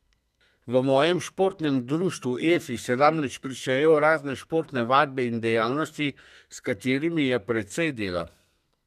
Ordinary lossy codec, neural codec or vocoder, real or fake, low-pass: none; codec, 32 kHz, 1.9 kbps, SNAC; fake; 14.4 kHz